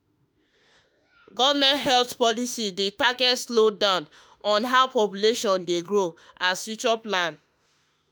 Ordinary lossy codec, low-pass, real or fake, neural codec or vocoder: none; none; fake; autoencoder, 48 kHz, 32 numbers a frame, DAC-VAE, trained on Japanese speech